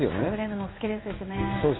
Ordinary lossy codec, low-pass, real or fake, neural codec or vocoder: AAC, 16 kbps; 7.2 kHz; fake; codec, 16 kHz in and 24 kHz out, 1 kbps, XY-Tokenizer